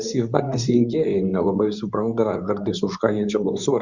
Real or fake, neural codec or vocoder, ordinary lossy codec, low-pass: fake; codec, 24 kHz, 0.9 kbps, WavTokenizer, medium speech release version 2; Opus, 64 kbps; 7.2 kHz